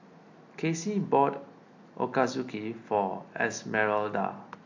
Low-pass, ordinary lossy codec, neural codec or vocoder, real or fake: 7.2 kHz; AAC, 48 kbps; none; real